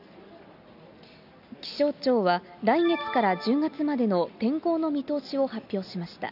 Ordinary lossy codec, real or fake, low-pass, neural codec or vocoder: none; real; 5.4 kHz; none